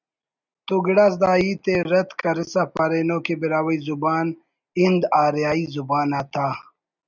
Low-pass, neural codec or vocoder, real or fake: 7.2 kHz; none; real